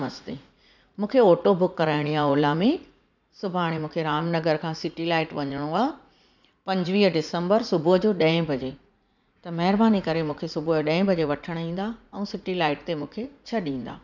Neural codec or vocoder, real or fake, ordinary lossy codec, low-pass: vocoder, 44.1 kHz, 80 mel bands, Vocos; fake; none; 7.2 kHz